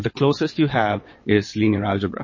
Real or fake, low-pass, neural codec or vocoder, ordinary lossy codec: fake; 7.2 kHz; vocoder, 22.05 kHz, 80 mel bands, WaveNeXt; MP3, 32 kbps